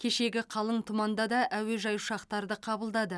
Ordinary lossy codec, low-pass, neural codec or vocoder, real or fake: none; none; none; real